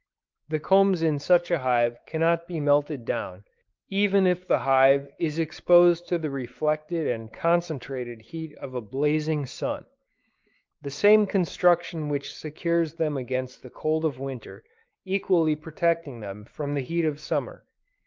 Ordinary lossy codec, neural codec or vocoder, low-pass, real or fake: Opus, 24 kbps; codec, 16 kHz, 4 kbps, X-Codec, WavLM features, trained on Multilingual LibriSpeech; 7.2 kHz; fake